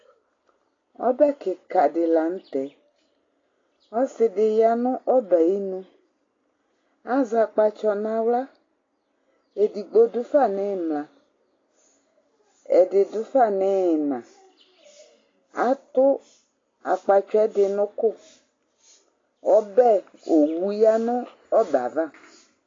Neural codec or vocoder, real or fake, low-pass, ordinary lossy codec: none; real; 7.2 kHz; AAC, 32 kbps